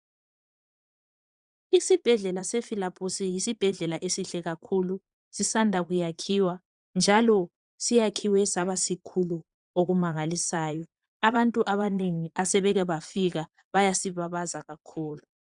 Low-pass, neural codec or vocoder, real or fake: 9.9 kHz; vocoder, 22.05 kHz, 80 mel bands, Vocos; fake